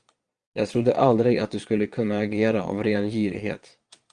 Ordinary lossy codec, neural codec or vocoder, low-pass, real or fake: Opus, 32 kbps; vocoder, 22.05 kHz, 80 mel bands, Vocos; 9.9 kHz; fake